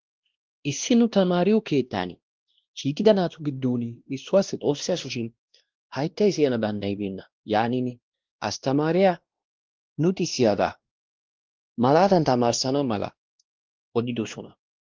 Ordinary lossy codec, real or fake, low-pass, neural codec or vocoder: Opus, 16 kbps; fake; 7.2 kHz; codec, 16 kHz, 1 kbps, X-Codec, WavLM features, trained on Multilingual LibriSpeech